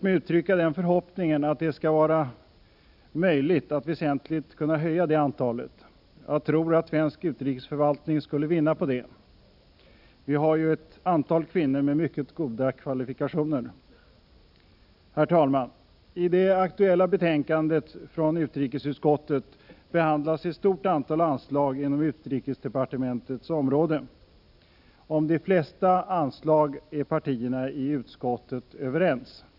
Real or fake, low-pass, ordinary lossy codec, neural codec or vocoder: real; 5.4 kHz; none; none